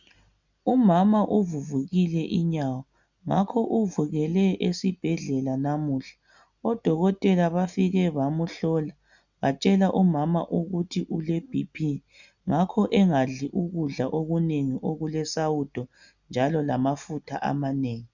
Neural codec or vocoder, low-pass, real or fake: none; 7.2 kHz; real